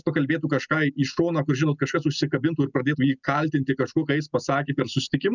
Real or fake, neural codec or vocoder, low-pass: real; none; 7.2 kHz